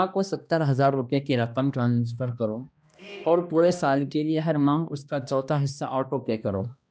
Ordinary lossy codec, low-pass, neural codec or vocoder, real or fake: none; none; codec, 16 kHz, 1 kbps, X-Codec, HuBERT features, trained on balanced general audio; fake